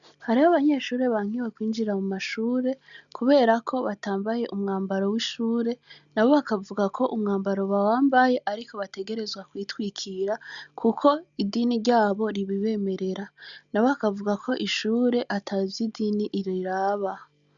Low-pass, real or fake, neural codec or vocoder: 7.2 kHz; real; none